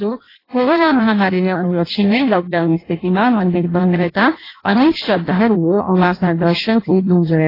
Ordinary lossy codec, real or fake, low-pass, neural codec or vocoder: AAC, 24 kbps; fake; 5.4 kHz; codec, 16 kHz in and 24 kHz out, 0.6 kbps, FireRedTTS-2 codec